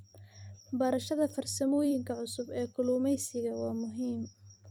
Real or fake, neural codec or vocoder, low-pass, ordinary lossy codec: fake; vocoder, 44.1 kHz, 128 mel bands every 256 samples, BigVGAN v2; 19.8 kHz; none